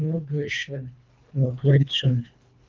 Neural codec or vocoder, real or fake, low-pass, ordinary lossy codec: codec, 24 kHz, 1.5 kbps, HILCodec; fake; 7.2 kHz; Opus, 32 kbps